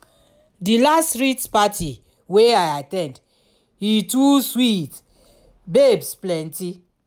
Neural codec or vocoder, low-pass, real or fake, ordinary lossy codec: none; none; real; none